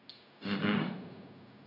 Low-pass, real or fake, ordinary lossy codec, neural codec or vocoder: 5.4 kHz; fake; none; codec, 16 kHz, 0.4 kbps, LongCat-Audio-Codec